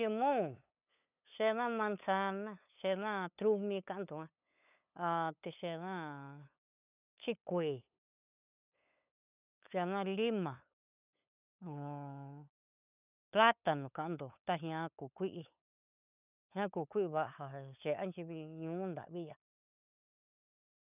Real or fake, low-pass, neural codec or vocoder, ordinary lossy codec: fake; 3.6 kHz; codec, 16 kHz, 8 kbps, FunCodec, trained on LibriTTS, 25 frames a second; none